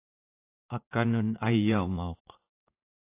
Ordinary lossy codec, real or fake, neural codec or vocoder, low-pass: AAC, 24 kbps; fake; vocoder, 44.1 kHz, 80 mel bands, Vocos; 3.6 kHz